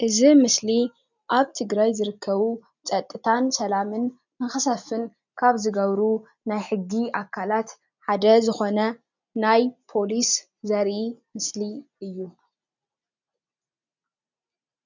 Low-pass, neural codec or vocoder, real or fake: 7.2 kHz; none; real